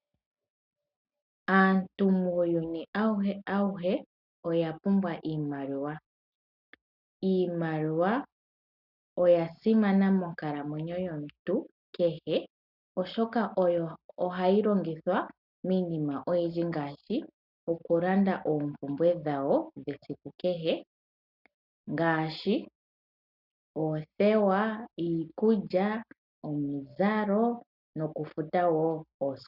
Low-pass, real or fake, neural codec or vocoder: 5.4 kHz; real; none